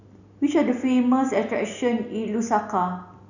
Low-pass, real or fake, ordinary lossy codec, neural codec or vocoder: 7.2 kHz; real; none; none